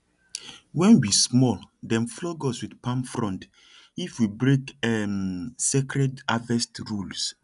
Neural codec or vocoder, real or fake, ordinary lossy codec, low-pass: none; real; none; 10.8 kHz